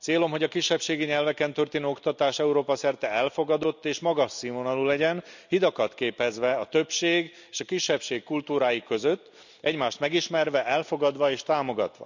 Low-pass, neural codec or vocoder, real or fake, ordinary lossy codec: 7.2 kHz; none; real; none